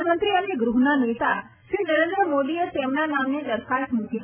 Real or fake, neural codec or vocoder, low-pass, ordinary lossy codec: real; none; 3.6 kHz; none